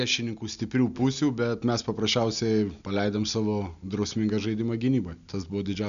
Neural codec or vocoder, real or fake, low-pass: none; real; 7.2 kHz